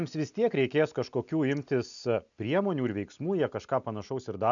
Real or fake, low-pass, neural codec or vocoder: real; 7.2 kHz; none